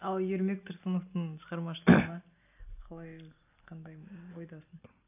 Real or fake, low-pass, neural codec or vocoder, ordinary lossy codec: real; 3.6 kHz; none; AAC, 32 kbps